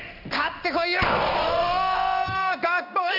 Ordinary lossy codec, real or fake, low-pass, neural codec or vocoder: none; fake; 5.4 kHz; codec, 16 kHz in and 24 kHz out, 1 kbps, XY-Tokenizer